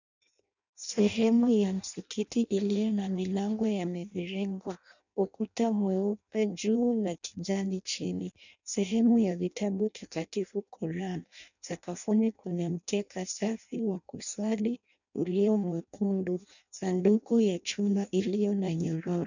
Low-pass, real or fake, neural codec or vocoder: 7.2 kHz; fake; codec, 16 kHz in and 24 kHz out, 0.6 kbps, FireRedTTS-2 codec